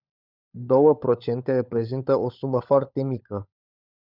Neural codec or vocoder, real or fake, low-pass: codec, 16 kHz, 16 kbps, FunCodec, trained on LibriTTS, 50 frames a second; fake; 5.4 kHz